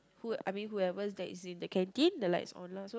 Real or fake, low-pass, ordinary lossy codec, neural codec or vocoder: real; none; none; none